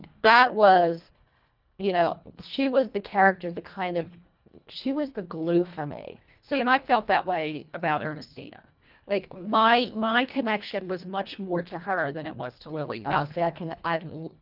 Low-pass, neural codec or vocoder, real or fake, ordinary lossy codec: 5.4 kHz; codec, 24 kHz, 1.5 kbps, HILCodec; fake; Opus, 24 kbps